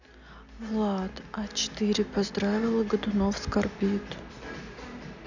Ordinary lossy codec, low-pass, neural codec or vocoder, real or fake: none; 7.2 kHz; none; real